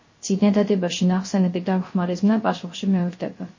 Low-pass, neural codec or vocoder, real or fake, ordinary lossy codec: 7.2 kHz; codec, 16 kHz, 0.3 kbps, FocalCodec; fake; MP3, 32 kbps